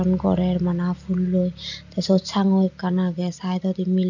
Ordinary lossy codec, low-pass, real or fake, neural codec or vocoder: none; 7.2 kHz; real; none